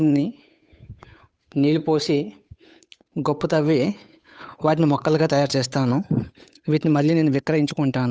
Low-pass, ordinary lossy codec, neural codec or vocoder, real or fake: none; none; codec, 16 kHz, 8 kbps, FunCodec, trained on Chinese and English, 25 frames a second; fake